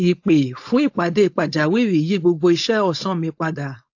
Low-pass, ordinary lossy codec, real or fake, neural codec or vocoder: 7.2 kHz; AAC, 48 kbps; fake; codec, 16 kHz, 4.8 kbps, FACodec